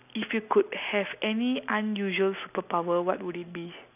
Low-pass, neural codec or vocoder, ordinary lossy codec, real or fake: 3.6 kHz; none; none; real